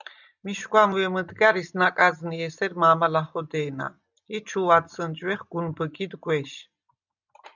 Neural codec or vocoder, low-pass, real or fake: none; 7.2 kHz; real